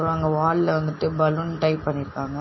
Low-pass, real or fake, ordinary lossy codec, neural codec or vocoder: 7.2 kHz; real; MP3, 24 kbps; none